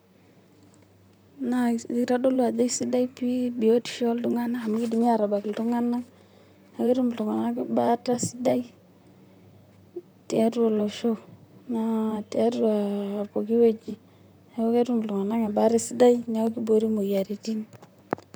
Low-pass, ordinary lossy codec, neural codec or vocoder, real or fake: none; none; vocoder, 44.1 kHz, 128 mel bands, Pupu-Vocoder; fake